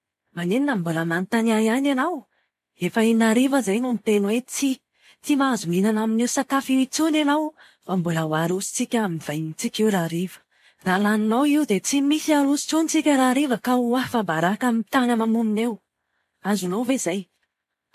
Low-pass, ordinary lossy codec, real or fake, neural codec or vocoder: 14.4 kHz; MP3, 64 kbps; real; none